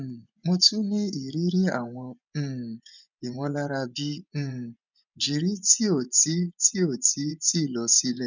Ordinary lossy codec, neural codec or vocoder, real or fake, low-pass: none; vocoder, 24 kHz, 100 mel bands, Vocos; fake; 7.2 kHz